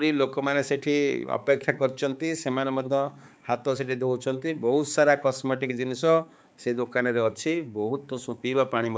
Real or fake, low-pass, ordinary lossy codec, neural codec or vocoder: fake; none; none; codec, 16 kHz, 4 kbps, X-Codec, HuBERT features, trained on balanced general audio